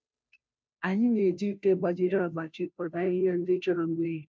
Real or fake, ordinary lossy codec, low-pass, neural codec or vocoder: fake; none; none; codec, 16 kHz, 0.5 kbps, FunCodec, trained on Chinese and English, 25 frames a second